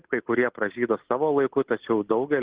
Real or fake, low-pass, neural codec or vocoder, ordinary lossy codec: real; 3.6 kHz; none; Opus, 16 kbps